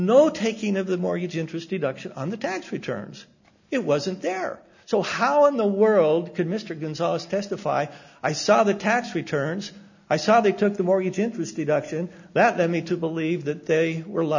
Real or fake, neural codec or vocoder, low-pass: real; none; 7.2 kHz